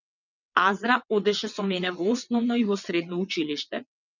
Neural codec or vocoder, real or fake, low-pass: vocoder, 22.05 kHz, 80 mel bands, WaveNeXt; fake; 7.2 kHz